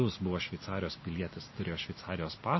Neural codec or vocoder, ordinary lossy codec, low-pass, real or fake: none; MP3, 24 kbps; 7.2 kHz; real